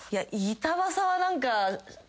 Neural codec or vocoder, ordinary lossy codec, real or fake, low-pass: none; none; real; none